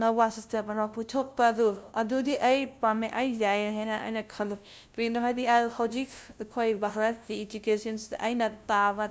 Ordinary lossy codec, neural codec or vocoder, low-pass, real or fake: none; codec, 16 kHz, 0.5 kbps, FunCodec, trained on LibriTTS, 25 frames a second; none; fake